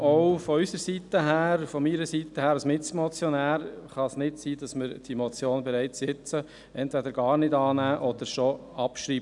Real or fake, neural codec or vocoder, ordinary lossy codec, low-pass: real; none; none; 10.8 kHz